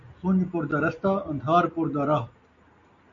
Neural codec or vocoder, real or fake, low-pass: none; real; 7.2 kHz